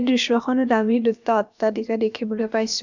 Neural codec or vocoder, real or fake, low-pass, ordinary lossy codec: codec, 16 kHz, about 1 kbps, DyCAST, with the encoder's durations; fake; 7.2 kHz; none